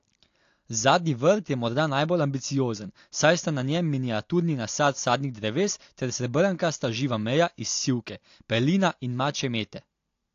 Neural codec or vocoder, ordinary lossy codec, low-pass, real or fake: none; AAC, 48 kbps; 7.2 kHz; real